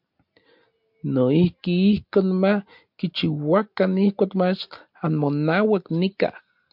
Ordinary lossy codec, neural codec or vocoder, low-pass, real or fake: MP3, 48 kbps; none; 5.4 kHz; real